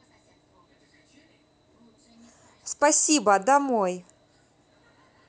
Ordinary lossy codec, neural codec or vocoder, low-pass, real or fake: none; none; none; real